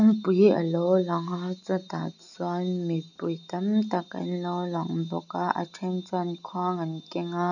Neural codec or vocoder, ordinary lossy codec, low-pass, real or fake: none; none; 7.2 kHz; real